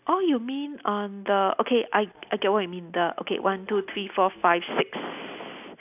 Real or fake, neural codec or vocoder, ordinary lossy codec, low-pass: real; none; none; 3.6 kHz